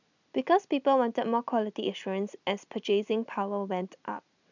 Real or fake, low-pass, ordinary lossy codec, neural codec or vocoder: real; 7.2 kHz; none; none